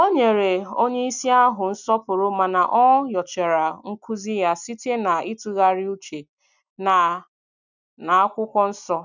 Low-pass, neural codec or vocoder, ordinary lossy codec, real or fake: 7.2 kHz; none; none; real